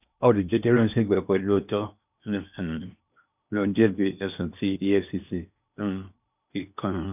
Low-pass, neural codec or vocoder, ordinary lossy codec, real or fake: 3.6 kHz; codec, 16 kHz in and 24 kHz out, 0.8 kbps, FocalCodec, streaming, 65536 codes; none; fake